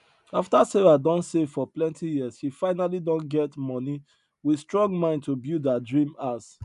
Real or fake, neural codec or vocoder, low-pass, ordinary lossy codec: real; none; 10.8 kHz; none